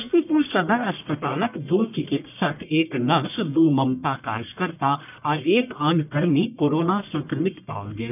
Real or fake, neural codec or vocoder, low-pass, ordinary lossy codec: fake; codec, 44.1 kHz, 1.7 kbps, Pupu-Codec; 3.6 kHz; none